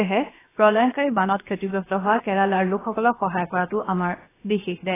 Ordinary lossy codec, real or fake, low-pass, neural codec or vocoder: AAC, 16 kbps; fake; 3.6 kHz; codec, 16 kHz, about 1 kbps, DyCAST, with the encoder's durations